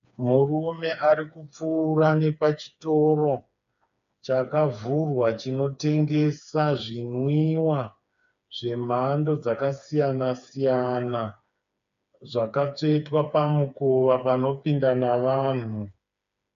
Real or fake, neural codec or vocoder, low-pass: fake; codec, 16 kHz, 4 kbps, FreqCodec, smaller model; 7.2 kHz